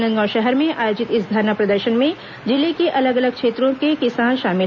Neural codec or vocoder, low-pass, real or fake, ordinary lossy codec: none; 7.2 kHz; real; none